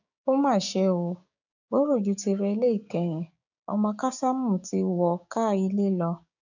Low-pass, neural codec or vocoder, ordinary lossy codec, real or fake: 7.2 kHz; codec, 16 kHz, 6 kbps, DAC; none; fake